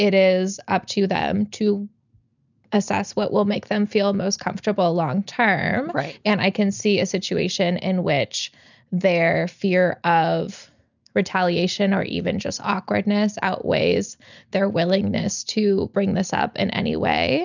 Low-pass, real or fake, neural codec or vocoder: 7.2 kHz; real; none